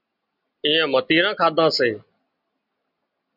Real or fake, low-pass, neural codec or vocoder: real; 5.4 kHz; none